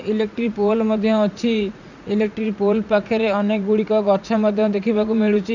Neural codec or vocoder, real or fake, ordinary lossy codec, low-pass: vocoder, 44.1 kHz, 80 mel bands, Vocos; fake; none; 7.2 kHz